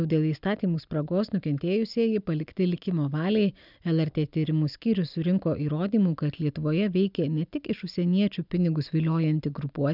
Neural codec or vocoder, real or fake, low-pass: none; real; 5.4 kHz